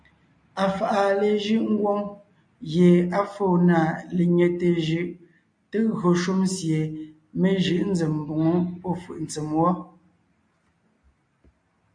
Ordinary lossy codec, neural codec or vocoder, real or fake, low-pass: MP3, 48 kbps; none; real; 9.9 kHz